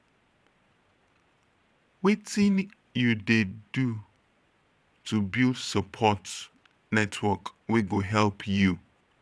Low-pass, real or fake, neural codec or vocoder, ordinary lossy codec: none; fake; vocoder, 22.05 kHz, 80 mel bands, Vocos; none